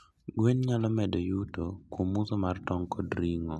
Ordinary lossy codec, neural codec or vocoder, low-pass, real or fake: none; none; none; real